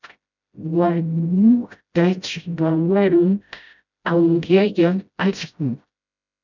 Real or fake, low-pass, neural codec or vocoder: fake; 7.2 kHz; codec, 16 kHz, 0.5 kbps, FreqCodec, smaller model